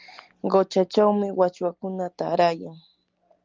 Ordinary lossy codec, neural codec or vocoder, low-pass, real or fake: Opus, 32 kbps; none; 7.2 kHz; real